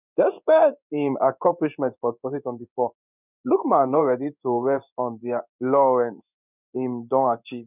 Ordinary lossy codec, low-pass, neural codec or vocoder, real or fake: none; 3.6 kHz; codec, 16 kHz in and 24 kHz out, 1 kbps, XY-Tokenizer; fake